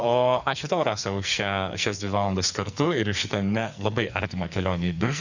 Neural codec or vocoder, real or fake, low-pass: codec, 44.1 kHz, 2.6 kbps, SNAC; fake; 7.2 kHz